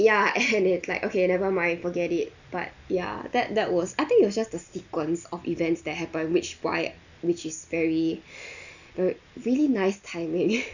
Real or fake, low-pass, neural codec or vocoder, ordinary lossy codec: real; 7.2 kHz; none; none